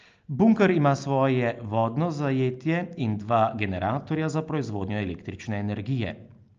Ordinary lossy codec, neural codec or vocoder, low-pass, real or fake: Opus, 24 kbps; none; 7.2 kHz; real